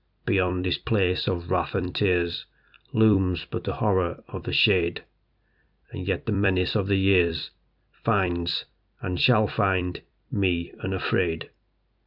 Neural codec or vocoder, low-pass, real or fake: vocoder, 44.1 kHz, 128 mel bands every 256 samples, BigVGAN v2; 5.4 kHz; fake